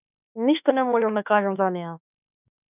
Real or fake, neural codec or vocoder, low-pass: fake; autoencoder, 48 kHz, 32 numbers a frame, DAC-VAE, trained on Japanese speech; 3.6 kHz